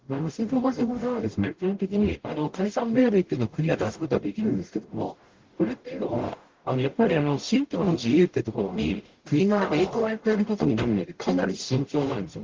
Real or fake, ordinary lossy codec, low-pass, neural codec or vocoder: fake; Opus, 16 kbps; 7.2 kHz; codec, 44.1 kHz, 0.9 kbps, DAC